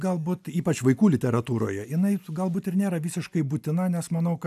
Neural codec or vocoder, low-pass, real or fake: none; 14.4 kHz; real